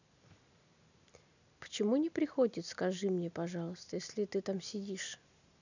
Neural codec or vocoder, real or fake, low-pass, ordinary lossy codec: none; real; 7.2 kHz; none